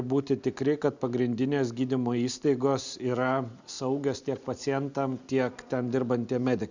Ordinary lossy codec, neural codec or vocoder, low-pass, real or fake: Opus, 64 kbps; none; 7.2 kHz; real